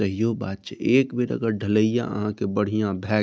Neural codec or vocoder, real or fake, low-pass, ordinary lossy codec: none; real; none; none